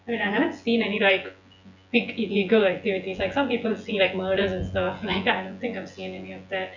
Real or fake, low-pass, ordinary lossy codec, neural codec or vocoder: fake; 7.2 kHz; none; vocoder, 24 kHz, 100 mel bands, Vocos